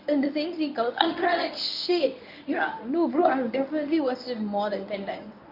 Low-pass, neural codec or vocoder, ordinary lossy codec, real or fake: 5.4 kHz; codec, 24 kHz, 0.9 kbps, WavTokenizer, medium speech release version 1; none; fake